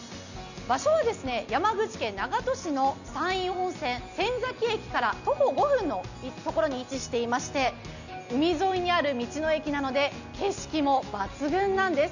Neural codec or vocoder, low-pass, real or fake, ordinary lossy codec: none; 7.2 kHz; real; none